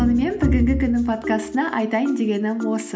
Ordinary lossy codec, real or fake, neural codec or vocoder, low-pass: none; real; none; none